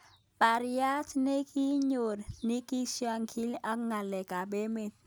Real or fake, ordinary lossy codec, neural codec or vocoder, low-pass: real; none; none; none